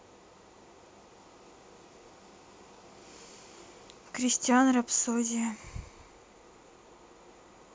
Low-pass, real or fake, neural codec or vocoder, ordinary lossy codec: none; real; none; none